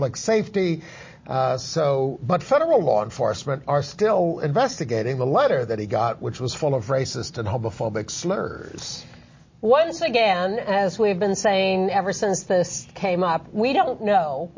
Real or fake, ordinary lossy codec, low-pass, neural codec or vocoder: real; MP3, 32 kbps; 7.2 kHz; none